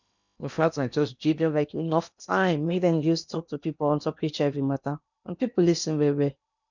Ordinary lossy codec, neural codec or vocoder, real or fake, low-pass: none; codec, 16 kHz in and 24 kHz out, 0.8 kbps, FocalCodec, streaming, 65536 codes; fake; 7.2 kHz